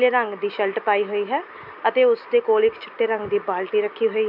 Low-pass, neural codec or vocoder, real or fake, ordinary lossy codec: 5.4 kHz; none; real; MP3, 48 kbps